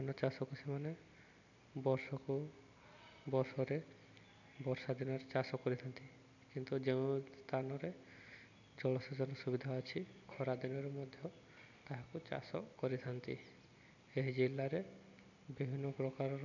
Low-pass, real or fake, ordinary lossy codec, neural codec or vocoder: 7.2 kHz; real; none; none